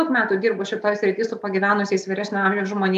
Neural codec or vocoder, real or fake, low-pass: none; real; 14.4 kHz